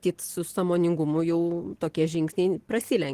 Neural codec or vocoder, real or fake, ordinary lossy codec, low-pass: none; real; Opus, 16 kbps; 14.4 kHz